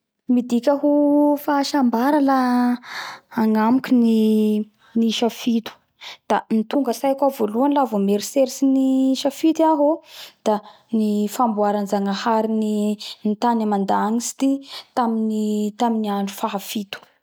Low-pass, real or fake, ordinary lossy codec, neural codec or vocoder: none; real; none; none